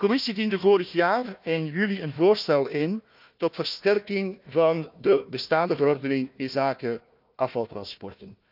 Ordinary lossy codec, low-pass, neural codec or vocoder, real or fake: none; 5.4 kHz; codec, 16 kHz, 1 kbps, FunCodec, trained on Chinese and English, 50 frames a second; fake